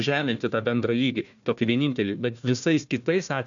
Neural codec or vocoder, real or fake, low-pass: codec, 16 kHz, 1 kbps, FunCodec, trained on Chinese and English, 50 frames a second; fake; 7.2 kHz